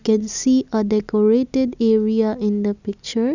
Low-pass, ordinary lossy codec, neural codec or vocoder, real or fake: 7.2 kHz; none; none; real